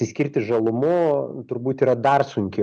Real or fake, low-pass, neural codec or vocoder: real; 9.9 kHz; none